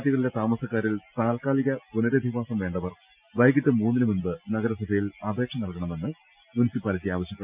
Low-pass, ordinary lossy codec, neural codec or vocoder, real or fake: 3.6 kHz; Opus, 24 kbps; none; real